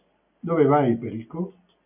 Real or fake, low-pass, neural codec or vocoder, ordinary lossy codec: real; 3.6 kHz; none; MP3, 32 kbps